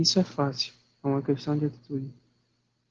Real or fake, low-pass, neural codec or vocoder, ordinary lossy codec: real; 7.2 kHz; none; Opus, 16 kbps